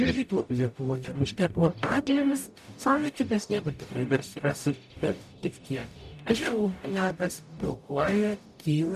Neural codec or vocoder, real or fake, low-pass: codec, 44.1 kHz, 0.9 kbps, DAC; fake; 14.4 kHz